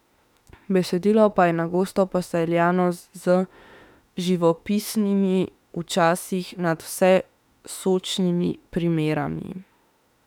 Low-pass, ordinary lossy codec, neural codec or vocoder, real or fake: 19.8 kHz; none; autoencoder, 48 kHz, 32 numbers a frame, DAC-VAE, trained on Japanese speech; fake